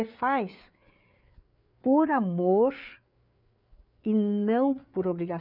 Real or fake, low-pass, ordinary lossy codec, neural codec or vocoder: fake; 5.4 kHz; none; codec, 16 kHz, 4 kbps, FreqCodec, larger model